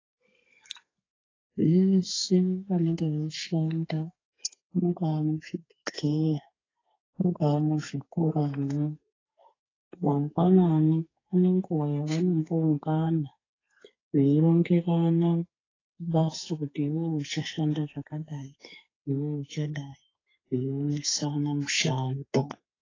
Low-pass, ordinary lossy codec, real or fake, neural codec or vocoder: 7.2 kHz; AAC, 32 kbps; fake; codec, 32 kHz, 1.9 kbps, SNAC